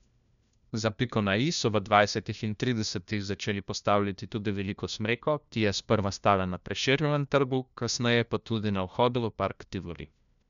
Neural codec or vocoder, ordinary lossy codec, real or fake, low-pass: codec, 16 kHz, 1 kbps, FunCodec, trained on LibriTTS, 50 frames a second; none; fake; 7.2 kHz